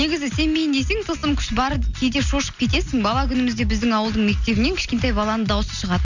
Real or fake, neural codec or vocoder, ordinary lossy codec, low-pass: real; none; none; 7.2 kHz